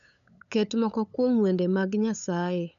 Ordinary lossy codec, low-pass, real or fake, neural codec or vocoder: none; 7.2 kHz; fake; codec, 16 kHz, 8 kbps, FunCodec, trained on LibriTTS, 25 frames a second